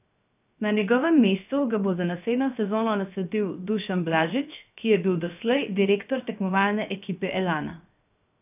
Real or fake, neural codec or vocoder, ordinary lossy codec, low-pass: fake; codec, 16 kHz, 0.7 kbps, FocalCodec; none; 3.6 kHz